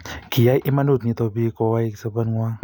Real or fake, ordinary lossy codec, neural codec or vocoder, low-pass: real; none; none; 19.8 kHz